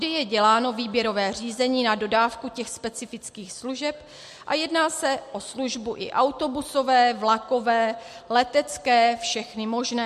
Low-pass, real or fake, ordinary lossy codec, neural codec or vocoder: 14.4 kHz; real; MP3, 64 kbps; none